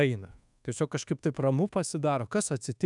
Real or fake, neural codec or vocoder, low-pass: fake; codec, 24 kHz, 1.2 kbps, DualCodec; 10.8 kHz